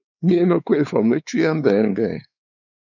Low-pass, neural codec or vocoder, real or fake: 7.2 kHz; codec, 16 kHz, 4 kbps, X-Codec, WavLM features, trained on Multilingual LibriSpeech; fake